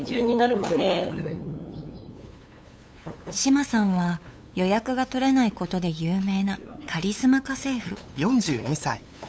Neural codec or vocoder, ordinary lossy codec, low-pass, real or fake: codec, 16 kHz, 8 kbps, FunCodec, trained on LibriTTS, 25 frames a second; none; none; fake